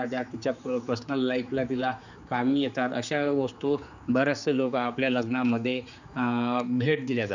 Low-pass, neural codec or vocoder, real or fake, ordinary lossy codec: 7.2 kHz; codec, 16 kHz, 4 kbps, X-Codec, HuBERT features, trained on general audio; fake; none